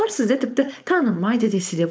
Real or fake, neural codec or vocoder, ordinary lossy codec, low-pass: fake; codec, 16 kHz, 4.8 kbps, FACodec; none; none